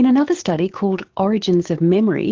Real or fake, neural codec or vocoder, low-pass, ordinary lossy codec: fake; codec, 16 kHz, 6 kbps, DAC; 7.2 kHz; Opus, 16 kbps